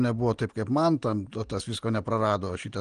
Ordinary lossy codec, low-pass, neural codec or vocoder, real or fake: Opus, 24 kbps; 9.9 kHz; none; real